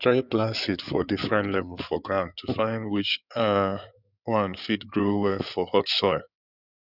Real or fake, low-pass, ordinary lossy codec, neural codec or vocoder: fake; 5.4 kHz; none; codec, 16 kHz in and 24 kHz out, 2.2 kbps, FireRedTTS-2 codec